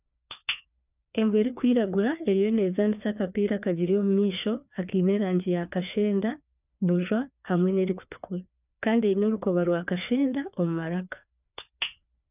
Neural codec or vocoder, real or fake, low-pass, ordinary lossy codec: codec, 16 kHz, 2 kbps, FreqCodec, larger model; fake; 3.6 kHz; none